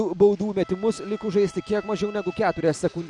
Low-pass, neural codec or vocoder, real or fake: 10.8 kHz; none; real